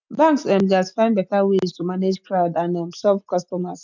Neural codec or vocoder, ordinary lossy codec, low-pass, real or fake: none; none; 7.2 kHz; real